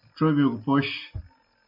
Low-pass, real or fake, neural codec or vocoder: 5.4 kHz; real; none